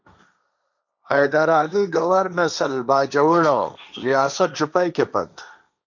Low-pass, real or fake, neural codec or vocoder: 7.2 kHz; fake; codec, 16 kHz, 1.1 kbps, Voila-Tokenizer